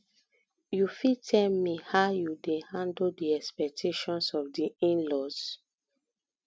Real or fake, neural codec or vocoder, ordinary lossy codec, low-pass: real; none; none; none